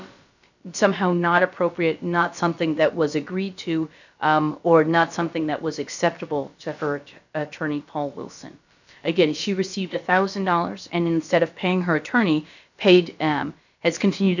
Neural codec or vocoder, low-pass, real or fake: codec, 16 kHz, about 1 kbps, DyCAST, with the encoder's durations; 7.2 kHz; fake